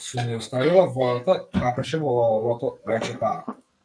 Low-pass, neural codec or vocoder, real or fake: 9.9 kHz; codec, 44.1 kHz, 2.6 kbps, SNAC; fake